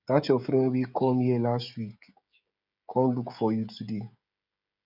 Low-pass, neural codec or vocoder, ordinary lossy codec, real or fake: 5.4 kHz; codec, 16 kHz, 16 kbps, FreqCodec, smaller model; none; fake